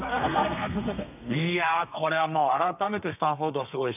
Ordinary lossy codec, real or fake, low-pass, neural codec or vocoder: none; fake; 3.6 kHz; codec, 32 kHz, 1.9 kbps, SNAC